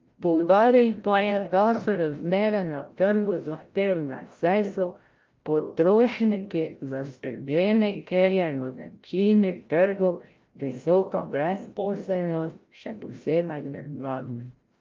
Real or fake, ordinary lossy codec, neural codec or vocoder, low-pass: fake; Opus, 32 kbps; codec, 16 kHz, 0.5 kbps, FreqCodec, larger model; 7.2 kHz